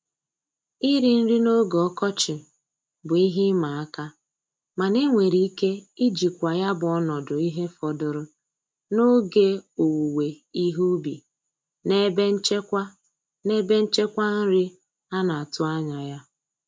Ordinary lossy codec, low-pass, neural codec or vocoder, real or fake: none; none; none; real